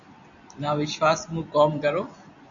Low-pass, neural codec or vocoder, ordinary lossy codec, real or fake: 7.2 kHz; none; AAC, 64 kbps; real